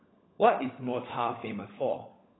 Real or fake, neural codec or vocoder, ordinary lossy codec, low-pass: fake; codec, 16 kHz, 16 kbps, FunCodec, trained on LibriTTS, 50 frames a second; AAC, 16 kbps; 7.2 kHz